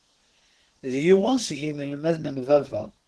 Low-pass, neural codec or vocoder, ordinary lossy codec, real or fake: 10.8 kHz; codec, 24 kHz, 1 kbps, SNAC; Opus, 16 kbps; fake